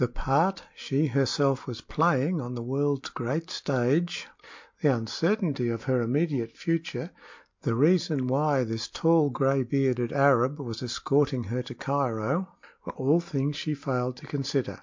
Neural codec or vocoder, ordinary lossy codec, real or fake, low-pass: none; MP3, 48 kbps; real; 7.2 kHz